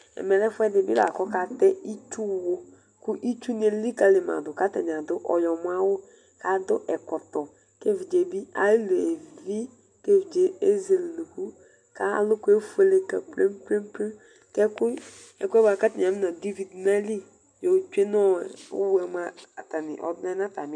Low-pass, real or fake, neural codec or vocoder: 9.9 kHz; real; none